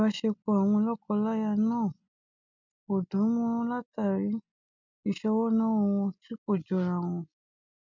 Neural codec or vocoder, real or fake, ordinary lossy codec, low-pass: none; real; none; 7.2 kHz